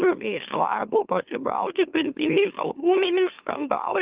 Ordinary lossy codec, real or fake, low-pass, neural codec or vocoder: Opus, 32 kbps; fake; 3.6 kHz; autoencoder, 44.1 kHz, a latent of 192 numbers a frame, MeloTTS